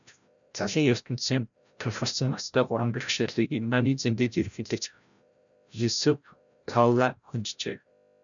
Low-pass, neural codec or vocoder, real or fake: 7.2 kHz; codec, 16 kHz, 0.5 kbps, FreqCodec, larger model; fake